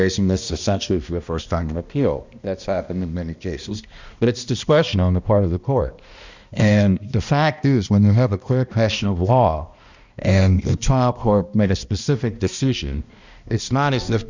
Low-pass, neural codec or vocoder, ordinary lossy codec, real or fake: 7.2 kHz; codec, 16 kHz, 1 kbps, X-Codec, HuBERT features, trained on balanced general audio; Opus, 64 kbps; fake